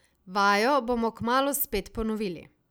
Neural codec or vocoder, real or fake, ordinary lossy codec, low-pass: none; real; none; none